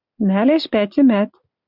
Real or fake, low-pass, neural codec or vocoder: real; 5.4 kHz; none